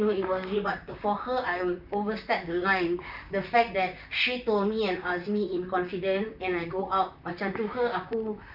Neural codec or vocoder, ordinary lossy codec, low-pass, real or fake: vocoder, 44.1 kHz, 128 mel bands, Pupu-Vocoder; none; 5.4 kHz; fake